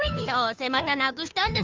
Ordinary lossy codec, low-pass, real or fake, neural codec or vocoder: Opus, 32 kbps; 7.2 kHz; fake; codec, 16 kHz, 2 kbps, FunCodec, trained on Chinese and English, 25 frames a second